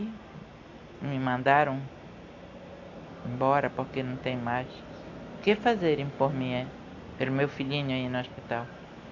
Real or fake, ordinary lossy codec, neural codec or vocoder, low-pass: real; AAC, 48 kbps; none; 7.2 kHz